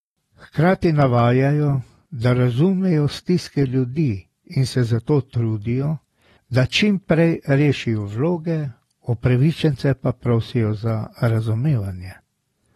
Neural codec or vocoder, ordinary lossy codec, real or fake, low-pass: none; AAC, 32 kbps; real; 19.8 kHz